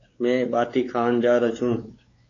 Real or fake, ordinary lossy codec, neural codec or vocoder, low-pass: fake; AAC, 32 kbps; codec, 16 kHz, 4 kbps, X-Codec, WavLM features, trained on Multilingual LibriSpeech; 7.2 kHz